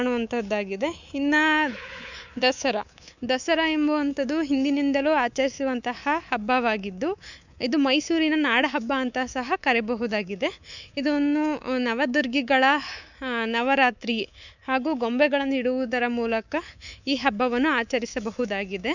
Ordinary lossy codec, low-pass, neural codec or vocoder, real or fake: none; 7.2 kHz; codec, 24 kHz, 3.1 kbps, DualCodec; fake